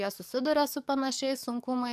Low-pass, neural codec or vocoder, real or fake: 14.4 kHz; codec, 44.1 kHz, 7.8 kbps, DAC; fake